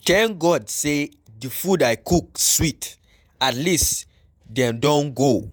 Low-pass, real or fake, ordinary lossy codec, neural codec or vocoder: none; fake; none; vocoder, 48 kHz, 128 mel bands, Vocos